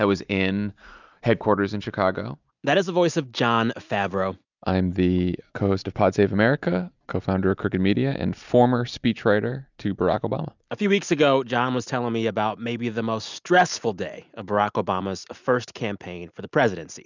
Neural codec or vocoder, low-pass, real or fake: none; 7.2 kHz; real